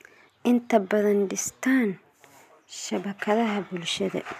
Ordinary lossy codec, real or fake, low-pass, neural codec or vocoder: none; real; 14.4 kHz; none